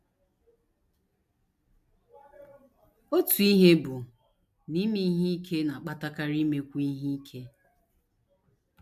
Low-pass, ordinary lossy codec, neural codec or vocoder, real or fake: 14.4 kHz; MP3, 96 kbps; none; real